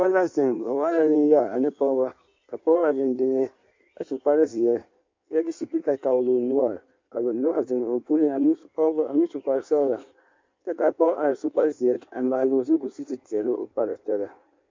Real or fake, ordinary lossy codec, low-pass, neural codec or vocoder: fake; MP3, 48 kbps; 7.2 kHz; codec, 16 kHz in and 24 kHz out, 1.1 kbps, FireRedTTS-2 codec